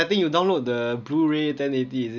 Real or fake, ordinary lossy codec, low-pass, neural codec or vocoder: real; none; 7.2 kHz; none